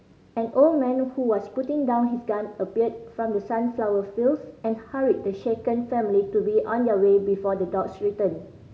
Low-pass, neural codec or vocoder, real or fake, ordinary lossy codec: none; none; real; none